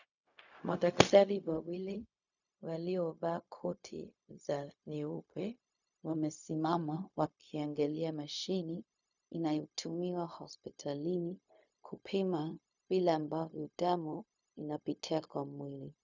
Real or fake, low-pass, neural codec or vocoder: fake; 7.2 kHz; codec, 16 kHz, 0.4 kbps, LongCat-Audio-Codec